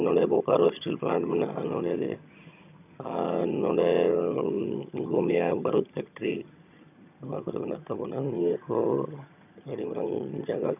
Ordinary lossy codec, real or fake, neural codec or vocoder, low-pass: none; fake; vocoder, 22.05 kHz, 80 mel bands, HiFi-GAN; 3.6 kHz